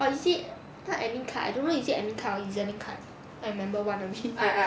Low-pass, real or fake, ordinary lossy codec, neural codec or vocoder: none; real; none; none